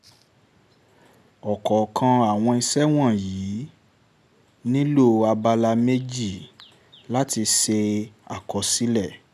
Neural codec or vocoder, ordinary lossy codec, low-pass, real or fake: none; none; 14.4 kHz; real